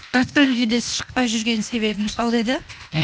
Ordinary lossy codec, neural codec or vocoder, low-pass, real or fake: none; codec, 16 kHz, 0.8 kbps, ZipCodec; none; fake